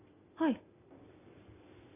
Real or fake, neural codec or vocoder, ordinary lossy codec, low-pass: real; none; none; 3.6 kHz